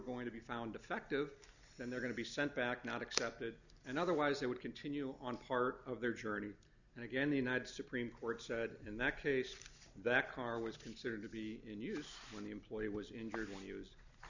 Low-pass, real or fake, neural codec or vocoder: 7.2 kHz; real; none